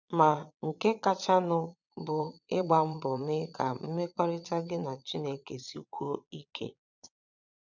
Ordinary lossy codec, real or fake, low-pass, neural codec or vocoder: none; fake; 7.2 kHz; vocoder, 22.05 kHz, 80 mel bands, Vocos